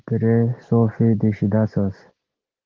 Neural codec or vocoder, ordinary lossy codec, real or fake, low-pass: none; Opus, 16 kbps; real; 7.2 kHz